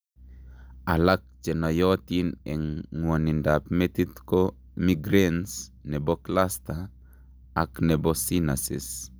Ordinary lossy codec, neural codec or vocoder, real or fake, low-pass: none; none; real; none